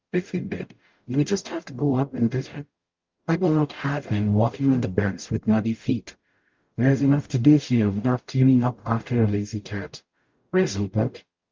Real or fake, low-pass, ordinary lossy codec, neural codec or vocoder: fake; 7.2 kHz; Opus, 32 kbps; codec, 44.1 kHz, 0.9 kbps, DAC